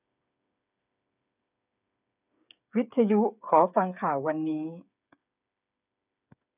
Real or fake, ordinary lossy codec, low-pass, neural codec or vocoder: fake; none; 3.6 kHz; codec, 16 kHz, 8 kbps, FreqCodec, smaller model